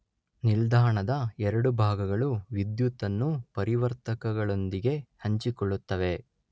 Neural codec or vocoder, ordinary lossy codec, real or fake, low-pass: none; none; real; none